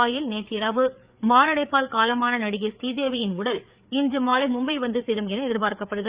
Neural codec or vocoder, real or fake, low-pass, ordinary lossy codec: codec, 16 kHz, 4 kbps, FreqCodec, larger model; fake; 3.6 kHz; Opus, 64 kbps